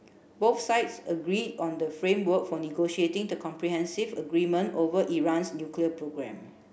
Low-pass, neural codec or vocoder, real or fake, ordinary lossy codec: none; none; real; none